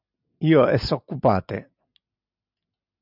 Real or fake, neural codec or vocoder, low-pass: real; none; 5.4 kHz